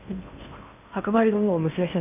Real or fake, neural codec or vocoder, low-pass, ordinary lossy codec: fake; codec, 16 kHz in and 24 kHz out, 0.8 kbps, FocalCodec, streaming, 65536 codes; 3.6 kHz; none